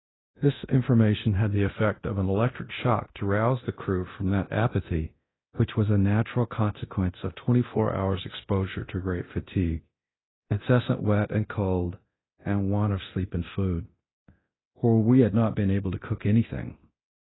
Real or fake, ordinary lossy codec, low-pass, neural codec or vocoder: fake; AAC, 16 kbps; 7.2 kHz; codec, 24 kHz, 0.9 kbps, DualCodec